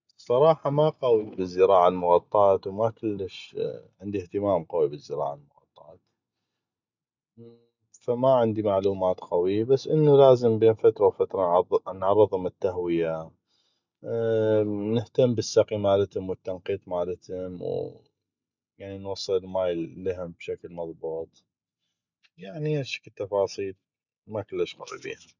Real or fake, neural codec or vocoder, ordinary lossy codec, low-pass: real; none; none; 7.2 kHz